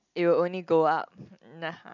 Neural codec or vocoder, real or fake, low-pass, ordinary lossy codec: none; real; 7.2 kHz; none